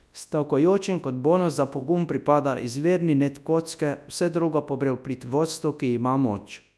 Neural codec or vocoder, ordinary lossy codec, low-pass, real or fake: codec, 24 kHz, 0.9 kbps, WavTokenizer, large speech release; none; none; fake